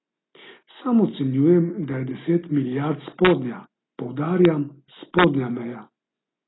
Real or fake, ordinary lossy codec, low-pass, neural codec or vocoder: real; AAC, 16 kbps; 7.2 kHz; none